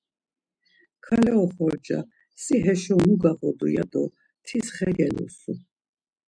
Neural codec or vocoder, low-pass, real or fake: none; 9.9 kHz; real